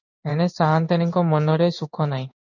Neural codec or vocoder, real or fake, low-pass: codec, 16 kHz in and 24 kHz out, 1 kbps, XY-Tokenizer; fake; 7.2 kHz